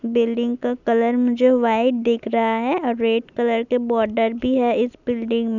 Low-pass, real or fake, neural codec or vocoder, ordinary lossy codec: 7.2 kHz; real; none; none